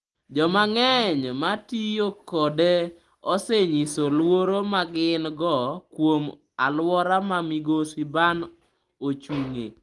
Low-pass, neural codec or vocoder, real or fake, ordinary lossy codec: 10.8 kHz; none; real; Opus, 24 kbps